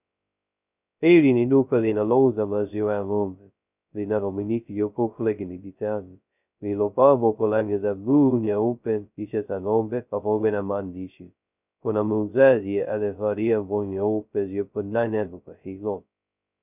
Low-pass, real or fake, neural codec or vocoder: 3.6 kHz; fake; codec, 16 kHz, 0.2 kbps, FocalCodec